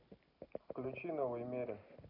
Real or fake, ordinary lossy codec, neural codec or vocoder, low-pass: real; none; none; 5.4 kHz